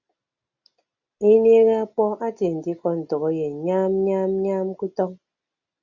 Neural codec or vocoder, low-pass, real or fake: none; 7.2 kHz; real